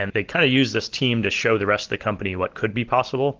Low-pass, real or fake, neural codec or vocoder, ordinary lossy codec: 7.2 kHz; real; none; Opus, 16 kbps